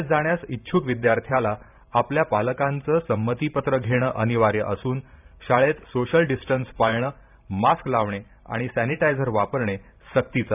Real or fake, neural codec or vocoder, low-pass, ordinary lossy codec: fake; vocoder, 44.1 kHz, 128 mel bands every 512 samples, BigVGAN v2; 3.6 kHz; none